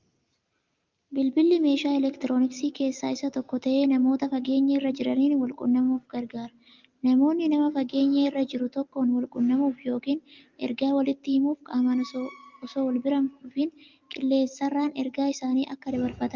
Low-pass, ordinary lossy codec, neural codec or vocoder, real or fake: 7.2 kHz; Opus, 24 kbps; none; real